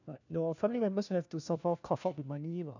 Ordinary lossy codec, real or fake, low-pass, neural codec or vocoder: none; fake; 7.2 kHz; codec, 16 kHz, 1 kbps, FunCodec, trained on LibriTTS, 50 frames a second